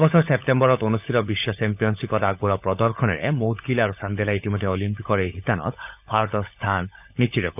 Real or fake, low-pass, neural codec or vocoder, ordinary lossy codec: fake; 3.6 kHz; codec, 16 kHz, 16 kbps, FunCodec, trained on LibriTTS, 50 frames a second; none